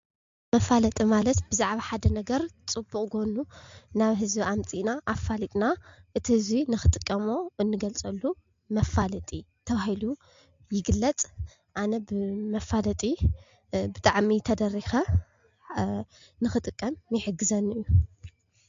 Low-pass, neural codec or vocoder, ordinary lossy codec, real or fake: 7.2 kHz; none; MP3, 48 kbps; real